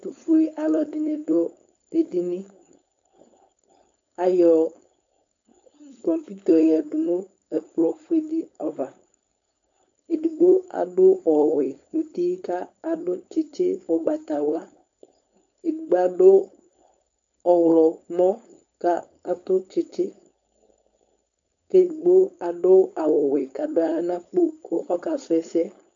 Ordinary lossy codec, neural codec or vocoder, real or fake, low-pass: MP3, 48 kbps; codec, 16 kHz, 4.8 kbps, FACodec; fake; 7.2 kHz